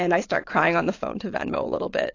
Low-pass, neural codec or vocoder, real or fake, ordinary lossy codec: 7.2 kHz; vocoder, 22.05 kHz, 80 mel bands, Vocos; fake; AAC, 32 kbps